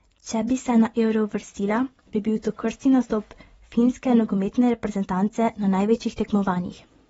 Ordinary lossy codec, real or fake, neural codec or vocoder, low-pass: AAC, 24 kbps; fake; vocoder, 24 kHz, 100 mel bands, Vocos; 10.8 kHz